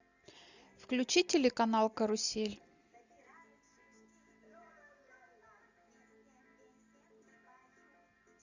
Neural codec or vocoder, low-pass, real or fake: none; 7.2 kHz; real